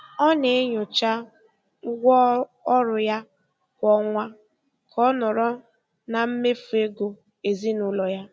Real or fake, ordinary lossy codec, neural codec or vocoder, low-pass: real; none; none; none